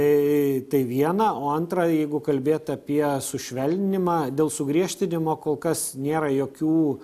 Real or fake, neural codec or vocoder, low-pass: real; none; 14.4 kHz